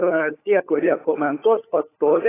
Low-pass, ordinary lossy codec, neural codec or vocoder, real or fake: 3.6 kHz; AAC, 16 kbps; codec, 16 kHz, 16 kbps, FunCodec, trained on LibriTTS, 50 frames a second; fake